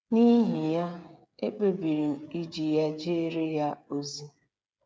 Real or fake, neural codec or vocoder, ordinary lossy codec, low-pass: fake; codec, 16 kHz, 8 kbps, FreqCodec, smaller model; none; none